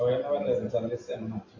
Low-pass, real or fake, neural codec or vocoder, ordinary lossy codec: 7.2 kHz; real; none; AAC, 48 kbps